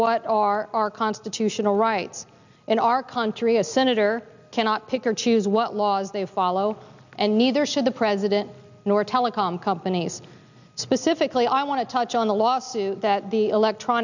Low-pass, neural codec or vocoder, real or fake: 7.2 kHz; none; real